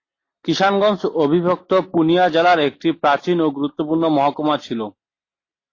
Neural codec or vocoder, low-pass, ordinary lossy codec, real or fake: none; 7.2 kHz; AAC, 32 kbps; real